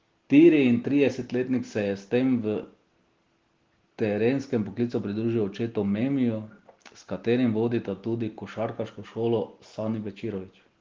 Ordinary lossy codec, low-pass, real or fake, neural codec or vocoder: Opus, 16 kbps; 7.2 kHz; real; none